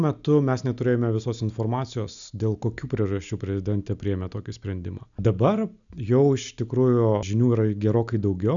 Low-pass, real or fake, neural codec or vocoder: 7.2 kHz; real; none